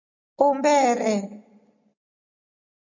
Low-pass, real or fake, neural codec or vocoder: 7.2 kHz; real; none